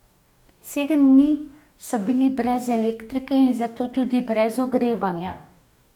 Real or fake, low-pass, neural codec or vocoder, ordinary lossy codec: fake; 19.8 kHz; codec, 44.1 kHz, 2.6 kbps, DAC; none